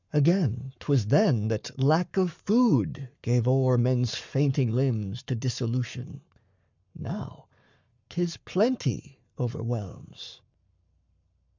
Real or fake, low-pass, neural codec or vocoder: fake; 7.2 kHz; codec, 44.1 kHz, 7.8 kbps, Pupu-Codec